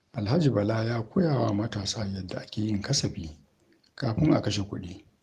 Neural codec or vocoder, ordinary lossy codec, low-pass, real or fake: none; Opus, 24 kbps; 14.4 kHz; real